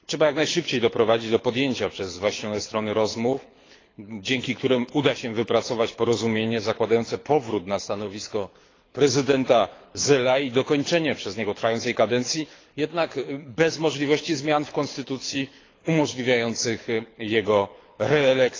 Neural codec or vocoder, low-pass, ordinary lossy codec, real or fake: codec, 24 kHz, 6 kbps, HILCodec; 7.2 kHz; AAC, 32 kbps; fake